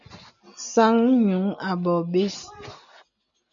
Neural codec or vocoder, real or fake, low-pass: none; real; 7.2 kHz